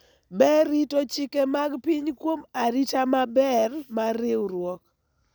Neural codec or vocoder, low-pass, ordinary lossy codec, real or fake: none; none; none; real